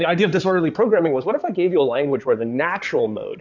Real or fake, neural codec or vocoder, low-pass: fake; codec, 16 kHz in and 24 kHz out, 2.2 kbps, FireRedTTS-2 codec; 7.2 kHz